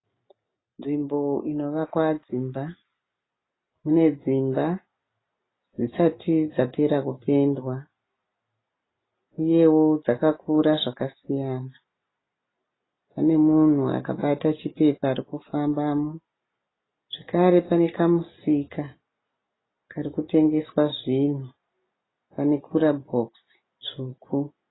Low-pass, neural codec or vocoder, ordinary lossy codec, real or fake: 7.2 kHz; none; AAC, 16 kbps; real